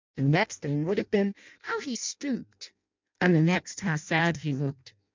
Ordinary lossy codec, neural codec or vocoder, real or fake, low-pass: MP3, 64 kbps; codec, 16 kHz in and 24 kHz out, 0.6 kbps, FireRedTTS-2 codec; fake; 7.2 kHz